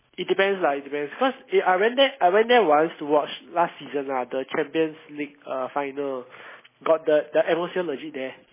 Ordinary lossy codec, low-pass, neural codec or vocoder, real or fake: MP3, 16 kbps; 3.6 kHz; none; real